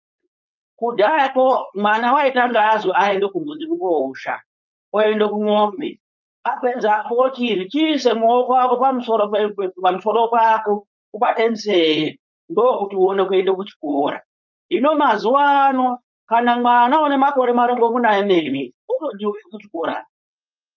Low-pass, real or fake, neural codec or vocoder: 7.2 kHz; fake; codec, 16 kHz, 4.8 kbps, FACodec